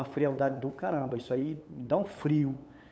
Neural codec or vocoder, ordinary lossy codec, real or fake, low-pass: codec, 16 kHz, 8 kbps, FunCodec, trained on LibriTTS, 25 frames a second; none; fake; none